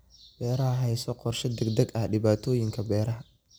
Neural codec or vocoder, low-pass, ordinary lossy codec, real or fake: none; none; none; real